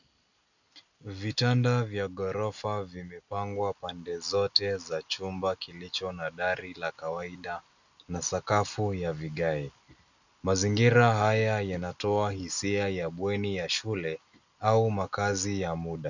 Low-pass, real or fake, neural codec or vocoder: 7.2 kHz; real; none